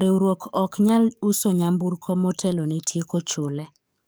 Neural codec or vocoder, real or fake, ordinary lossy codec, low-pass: codec, 44.1 kHz, 7.8 kbps, DAC; fake; none; none